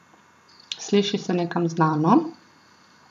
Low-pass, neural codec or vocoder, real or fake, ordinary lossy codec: 14.4 kHz; none; real; none